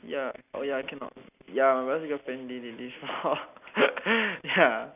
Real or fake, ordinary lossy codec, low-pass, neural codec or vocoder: real; none; 3.6 kHz; none